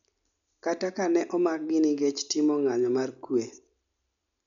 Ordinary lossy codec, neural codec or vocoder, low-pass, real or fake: none; none; 7.2 kHz; real